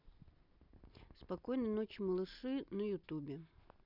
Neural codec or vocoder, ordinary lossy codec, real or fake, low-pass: none; none; real; 5.4 kHz